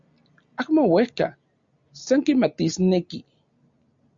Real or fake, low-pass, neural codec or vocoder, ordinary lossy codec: real; 7.2 kHz; none; Opus, 64 kbps